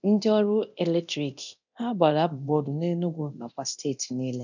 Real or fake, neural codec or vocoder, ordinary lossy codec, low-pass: fake; codec, 24 kHz, 0.9 kbps, DualCodec; none; 7.2 kHz